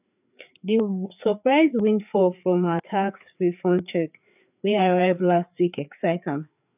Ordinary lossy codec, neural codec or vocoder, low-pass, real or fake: none; codec, 16 kHz, 4 kbps, FreqCodec, larger model; 3.6 kHz; fake